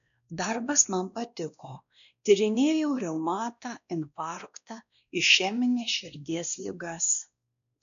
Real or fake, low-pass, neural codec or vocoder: fake; 7.2 kHz; codec, 16 kHz, 1 kbps, X-Codec, WavLM features, trained on Multilingual LibriSpeech